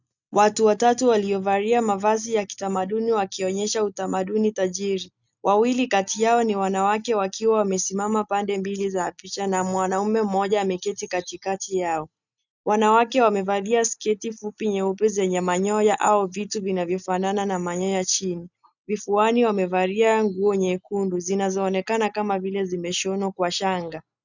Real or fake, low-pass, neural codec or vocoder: real; 7.2 kHz; none